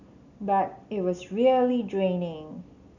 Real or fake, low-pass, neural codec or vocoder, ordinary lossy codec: real; 7.2 kHz; none; none